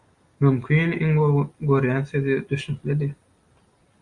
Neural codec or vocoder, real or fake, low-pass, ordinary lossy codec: none; real; 10.8 kHz; Opus, 64 kbps